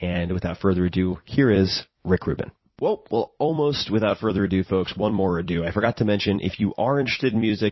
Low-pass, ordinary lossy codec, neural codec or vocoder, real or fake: 7.2 kHz; MP3, 24 kbps; vocoder, 44.1 kHz, 128 mel bands every 256 samples, BigVGAN v2; fake